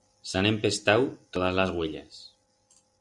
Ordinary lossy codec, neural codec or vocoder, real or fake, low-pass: Opus, 64 kbps; none; real; 10.8 kHz